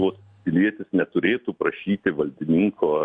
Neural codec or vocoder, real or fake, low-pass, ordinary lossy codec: none; real; 9.9 kHz; MP3, 64 kbps